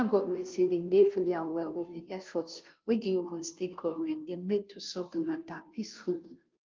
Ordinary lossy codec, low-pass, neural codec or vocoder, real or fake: Opus, 32 kbps; 7.2 kHz; codec, 16 kHz, 0.5 kbps, FunCodec, trained on Chinese and English, 25 frames a second; fake